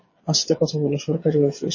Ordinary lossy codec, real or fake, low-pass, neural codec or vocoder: MP3, 32 kbps; fake; 7.2 kHz; codec, 16 kHz, 8 kbps, FreqCodec, smaller model